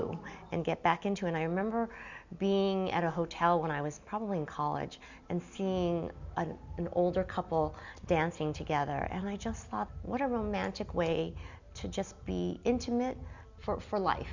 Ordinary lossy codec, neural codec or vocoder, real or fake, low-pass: Opus, 64 kbps; none; real; 7.2 kHz